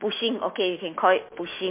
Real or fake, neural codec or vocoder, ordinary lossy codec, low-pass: fake; autoencoder, 48 kHz, 128 numbers a frame, DAC-VAE, trained on Japanese speech; MP3, 24 kbps; 3.6 kHz